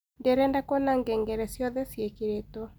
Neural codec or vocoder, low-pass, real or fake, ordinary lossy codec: none; none; real; none